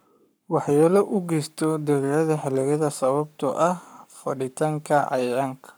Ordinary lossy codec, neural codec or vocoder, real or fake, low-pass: none; codec, 44.1 kHz, 7.8 kbps, Pupu-Codec; fake; none